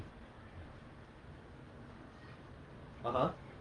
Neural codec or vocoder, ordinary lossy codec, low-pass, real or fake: none; Opus, 24 kbps; 9.9 kHz; real